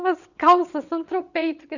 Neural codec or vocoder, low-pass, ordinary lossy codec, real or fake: vocoder, 22.05 kHz, 80 mel bands, WaveNeXt; 7.2 kHz; none; fake